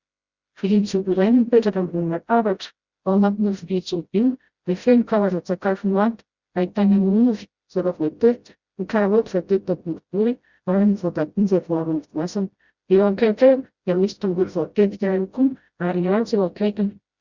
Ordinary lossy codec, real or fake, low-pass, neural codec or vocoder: Opus, 64 kbps; fake; 7.2 kHz; codec, 16 kHz, 0.5 kbps, FreqCodec, smaller model